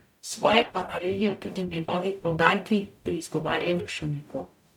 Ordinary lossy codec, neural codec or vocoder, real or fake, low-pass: none; codec, 44.1 kHz, 0.9 kbps, DAC; fake; 19.8 kHz